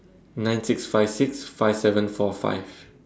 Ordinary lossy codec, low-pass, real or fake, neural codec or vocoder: none; none; real; none